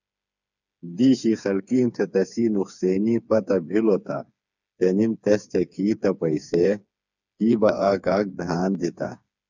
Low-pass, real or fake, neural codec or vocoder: 7.2 kHz; fake; codec, 16 kHz, 4 kbps, FreqCodec, smaller model